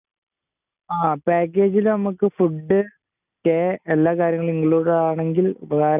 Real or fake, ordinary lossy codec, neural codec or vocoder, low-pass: real; none; none; 3.6 kHz